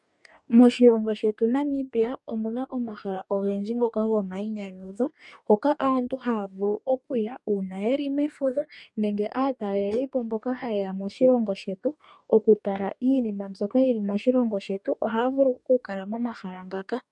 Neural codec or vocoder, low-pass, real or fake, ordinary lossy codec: codec, 44.1 kHz, 2.6 kbps, DAC; 10.8 kHz; fake; MP3, 96 kbps